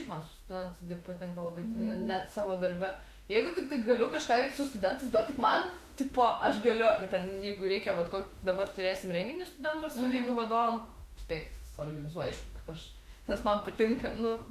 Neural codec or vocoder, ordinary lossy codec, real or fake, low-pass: autoencoder, 48 kHz, 32 numbers a frame, DAC-VAE, trained on Japanese speech; Opus, 64 kbps; fake; 14.4 kHz